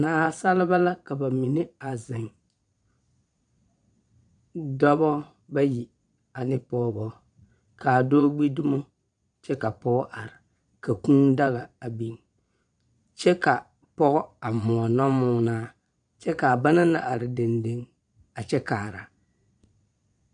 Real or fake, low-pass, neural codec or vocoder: fake; 9.9 kHz; vocoder, 22.05 kHz, 80 mel bands, Vocos